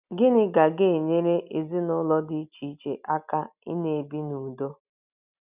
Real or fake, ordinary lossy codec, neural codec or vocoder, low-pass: real; none; none; 3.6 kHz